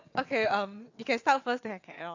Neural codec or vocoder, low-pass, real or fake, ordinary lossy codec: vocoder, 22.05 kHz, 80 mel bands, WaveNeXt; 7.2 kHz; fake; AAC, 48 kbps